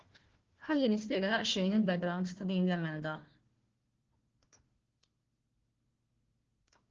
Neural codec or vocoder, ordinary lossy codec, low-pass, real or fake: codec, 16 kHz, 1 kbps, FunCodec, trained on Chinese and English, 50 frames a second; Opus, 16 kbps; 7.2 kHz; fake